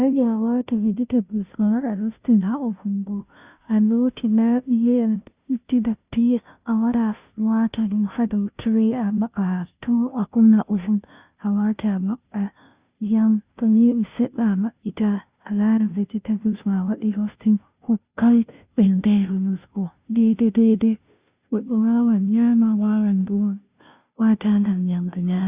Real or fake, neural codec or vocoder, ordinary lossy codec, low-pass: fake; codec, 16 kHz, 0.5 kbps, FunCodec, trained on Chinese and English, 25 frames a second; Opus, 64 kbps; 3.6 kHz